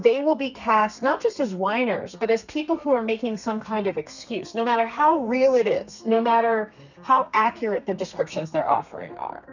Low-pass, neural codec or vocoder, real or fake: 7.2 kHz; codec, 32 kHz, 1.9 kbps, SNAC; fake